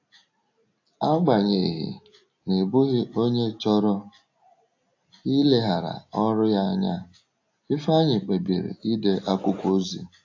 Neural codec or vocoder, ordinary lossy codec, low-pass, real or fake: none; AAC, 48 kbps; 7.2 kHz; real